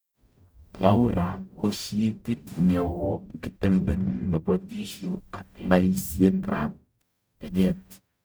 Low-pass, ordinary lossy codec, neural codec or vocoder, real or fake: none; none; codec, 44.1 kHz, 0.9 kbps, DAC; fake